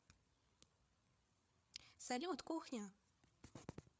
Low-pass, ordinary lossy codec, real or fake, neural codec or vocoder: none; none; fake; codec, 16 kHz, 8 kbps, FreqCodec, larger model